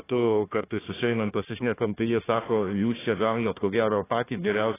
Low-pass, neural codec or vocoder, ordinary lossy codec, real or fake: 3.6 kHz; codec, 16 kHz, 1 kbps, FunCodec, trained on Chinese and English, 50 frames a second; AAC, 16 kbps; fake